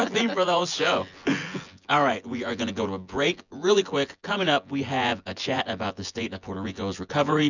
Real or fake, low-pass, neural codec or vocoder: fake; 7.2 kHz; vocoder, 24 kHz, 100 mel bands, Vocos